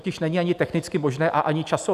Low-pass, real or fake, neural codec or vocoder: 14.4 kHz; real; none